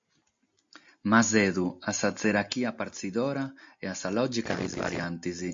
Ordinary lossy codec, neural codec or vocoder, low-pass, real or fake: MP3, 48 kbps; none; 7.2 kHz; real